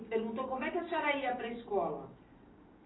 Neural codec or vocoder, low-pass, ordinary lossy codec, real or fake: none; 7.2 kHz; AAC, 16 kbps; real